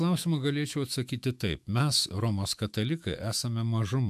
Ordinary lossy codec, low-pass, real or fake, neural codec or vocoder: AAC, 96 kbps; 14.4 kHz; fake; autoencoder, 48 kHz, 128 numbers a frame, DAC-VAE, trained on Japanese speech